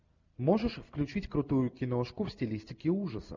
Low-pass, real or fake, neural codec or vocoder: 7.2 kHz; real; none